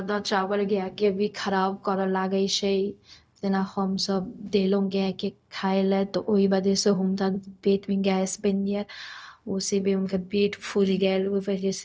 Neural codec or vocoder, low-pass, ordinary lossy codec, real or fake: codec, 16 kHz, 0.4 kbps, LongCat-Audio-Codec; none; none; fake